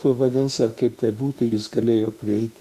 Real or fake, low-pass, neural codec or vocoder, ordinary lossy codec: fake; 14.4 kHz; autoencoder, 48 kHz, 32 numbers a frame, DAC-VAE, trained on Japanese speech; Opus, 64 kbps